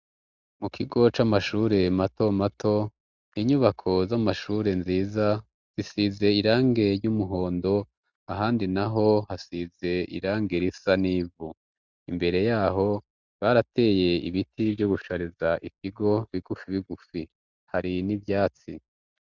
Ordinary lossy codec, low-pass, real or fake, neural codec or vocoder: Opus, 64 kbps; 7.2 kHz; real; none